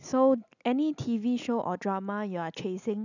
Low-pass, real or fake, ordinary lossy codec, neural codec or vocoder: 7.2 kHz; real; none; none